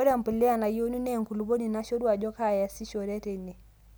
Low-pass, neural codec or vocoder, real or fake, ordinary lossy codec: none; none; real; none